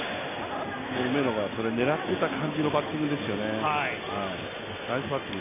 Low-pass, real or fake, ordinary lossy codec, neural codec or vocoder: 3.6 kHz; real; AAC, 32 kbps; none